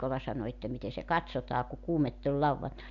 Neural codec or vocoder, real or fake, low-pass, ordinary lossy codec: none; real; 7.2 kHz; none